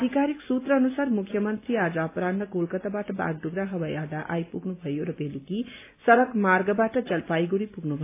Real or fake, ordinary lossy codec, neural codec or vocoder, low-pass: real; AAC, 24 kbps; none; 3.6 kHz